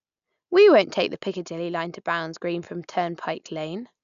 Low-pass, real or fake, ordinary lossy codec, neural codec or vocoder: 7.2 kHz; real; none; none